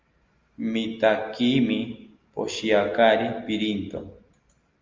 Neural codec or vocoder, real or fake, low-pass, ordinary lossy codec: none; real; 7.2 kHz; Opus, 32 kbps